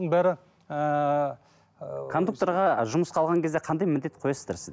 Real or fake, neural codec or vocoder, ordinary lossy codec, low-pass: real; none; none; none